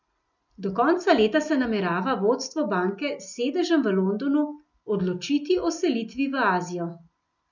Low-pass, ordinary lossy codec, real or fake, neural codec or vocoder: 7.2 kHz; none; real; none